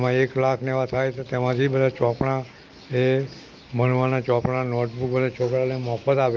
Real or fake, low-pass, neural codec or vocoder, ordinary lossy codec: real; 7.2 kHz; none; Opus, 32 kbps